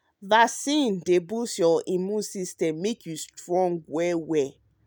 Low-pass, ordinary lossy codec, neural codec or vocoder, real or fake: none; none; none; real